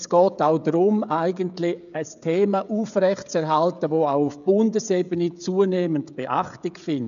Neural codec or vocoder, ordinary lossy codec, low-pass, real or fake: codec, 16 kHz, 16 kbps, FreqCodec, smaller model; none; 7.2 kHz; fake